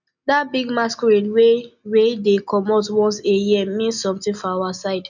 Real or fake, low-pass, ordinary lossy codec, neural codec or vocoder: real; 7.2 kHz; none; none